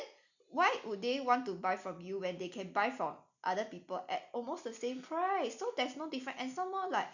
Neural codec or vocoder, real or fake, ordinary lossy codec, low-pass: none; real; none; 7.2 kHz